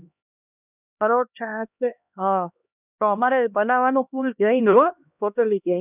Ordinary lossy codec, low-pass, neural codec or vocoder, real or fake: none; 3.6 kHz; codec, 16 kHz, 1 kbps, X-Codec, HuBERT features, trained on LibriSpeech; fake